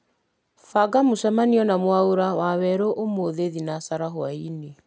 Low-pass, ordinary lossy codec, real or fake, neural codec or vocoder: none; none; real; none